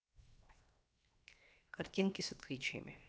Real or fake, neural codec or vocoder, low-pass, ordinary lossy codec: fake; codec, 16 kHz, 0.7 kbps, FocalCodec; none; none